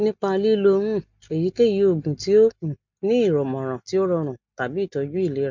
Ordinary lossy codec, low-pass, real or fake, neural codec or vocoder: MP3, 64 kbps; 7.2 kHz; real; none